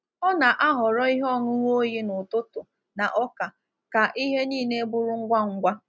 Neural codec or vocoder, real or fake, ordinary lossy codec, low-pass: none; real; none; none